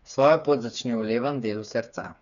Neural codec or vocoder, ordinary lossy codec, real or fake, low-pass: codec, 16 kHz, 4 kbps, FreqCodec, smaller model; none; fake; 7.2 kHz